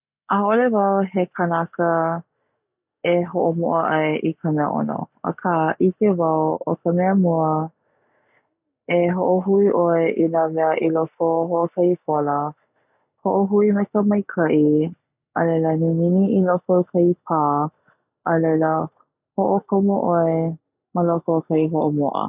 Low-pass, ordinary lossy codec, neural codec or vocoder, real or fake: 3.6 kHz; none; none; real